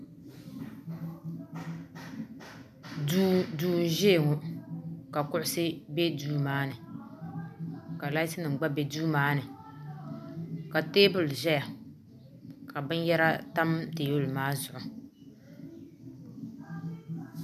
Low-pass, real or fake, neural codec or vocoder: 14.4 kHz; real; none